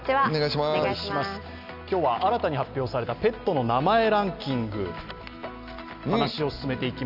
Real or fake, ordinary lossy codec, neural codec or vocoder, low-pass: real; none; none; 5.4 kHz